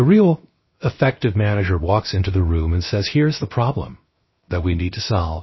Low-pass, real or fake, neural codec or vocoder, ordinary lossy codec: 7.2 kHz; fake; codec, 16 kHz, 0.7 kbps, FocalCodec; MP3, 24 kbps